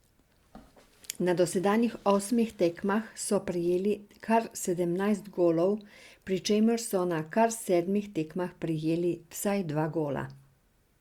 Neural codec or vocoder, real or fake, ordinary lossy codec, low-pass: none; real; Opus, 64 kbps; 19.8 kHz